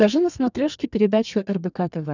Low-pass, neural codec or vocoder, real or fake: 7.2 kHz; codec, 32 kHz, 1.9 kbps, SNAC; fake